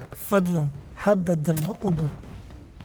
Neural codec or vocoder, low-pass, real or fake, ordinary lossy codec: codec, 44.1 kHz, 1.7 kbps, Pupu-Codec; none; fake; none